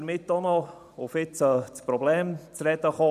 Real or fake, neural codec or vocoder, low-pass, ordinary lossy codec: fake; vocoder, 44.1 kHz, 128 mel bands every 512 samples, BigVGAN v2; 14.4 kHz; none